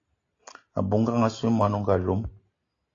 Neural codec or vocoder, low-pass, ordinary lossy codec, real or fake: none; 7.2 kHz; AAC, 32 kbps; real